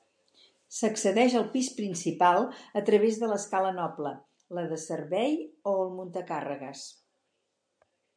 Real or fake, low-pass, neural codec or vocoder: real; 9.9 kHz; none